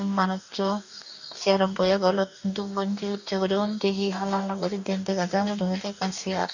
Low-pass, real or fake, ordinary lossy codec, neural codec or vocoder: 7.2 kHz; fake; none; codec, 44.1 kHz, 2.6 kbps, DAC